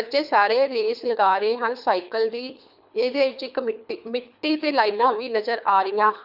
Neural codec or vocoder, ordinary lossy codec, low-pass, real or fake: codec, 24 kHz, 3 kbps, HILCodec; none; 5.4 kHz; fake